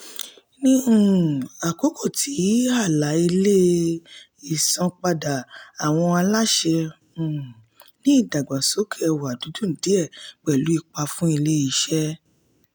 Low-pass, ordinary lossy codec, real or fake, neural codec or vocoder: none; none; real; none